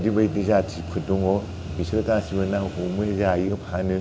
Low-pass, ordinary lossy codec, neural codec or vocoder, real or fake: none; none; none; real